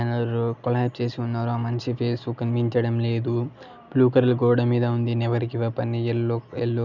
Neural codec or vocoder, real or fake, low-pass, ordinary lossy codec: none; real; 7.2 kHz; none